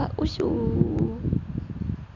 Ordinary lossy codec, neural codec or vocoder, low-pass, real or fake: none; none; 7.2 kHz; real